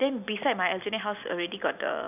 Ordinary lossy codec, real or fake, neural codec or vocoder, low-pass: none; real; none; 3.6 kHz